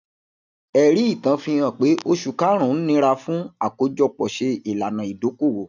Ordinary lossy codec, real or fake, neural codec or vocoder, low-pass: none; real; none; 7.2 kHz